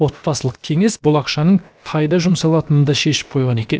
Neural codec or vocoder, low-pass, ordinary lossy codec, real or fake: codec, 16 kHz, about 1 kbps, DyCAST, with the encoder's durations; none; none; fake